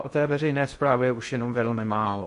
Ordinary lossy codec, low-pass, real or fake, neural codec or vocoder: MP3, 48 kbps; 10.8 kHz; fake; codec, 16 kHz in and 24 kHz out, 0.6 kbps, FocalCodec, streaming, 2048 codes